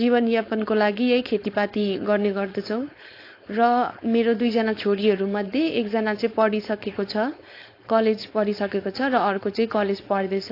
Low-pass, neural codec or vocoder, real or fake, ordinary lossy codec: 5.4 kHz; codec, 16 kHz, 4.8 kbps, FACodec; fake; AAC, 32 kbps